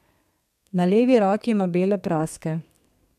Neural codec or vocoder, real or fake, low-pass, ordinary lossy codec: codec, 32 kHz, 1.9 kbps, SNAC; fake; 14.4 kHz; none